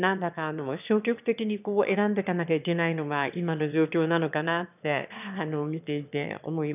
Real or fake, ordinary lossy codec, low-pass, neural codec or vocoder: fake; none; 3.6 kHz; autoencoder, 22.05 kHz, a latent of 192 numbers a frame, VITS, trained on one speaker